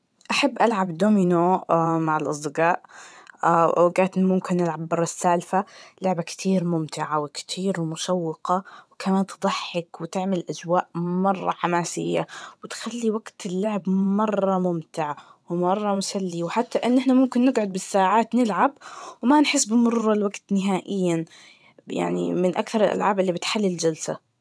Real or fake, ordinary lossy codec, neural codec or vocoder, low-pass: fake; none; vocoder, 22.05 kHz, 80 mel bands, WaveNeXt; none